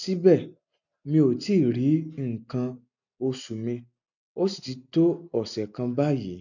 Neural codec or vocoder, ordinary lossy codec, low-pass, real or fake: none; none; 7.2 kHz; real